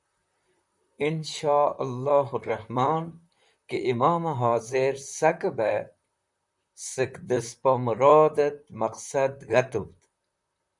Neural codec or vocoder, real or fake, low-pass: vocoder, 44.1 kHz, 128 mel bands, Pupu-Vocoder; fake; 10.8 kHz